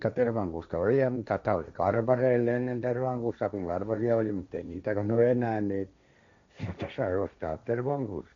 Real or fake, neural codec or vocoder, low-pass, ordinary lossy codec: fake; codec, 16 kHz, 1.1 kbps, Voila-Tokenizer; 7.2 kHz; MP3, 64 kbps